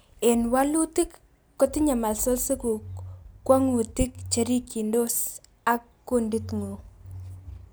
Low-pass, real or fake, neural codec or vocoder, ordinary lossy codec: none; real; none; none